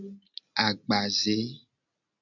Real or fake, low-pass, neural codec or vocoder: real; 7.2 kHz; none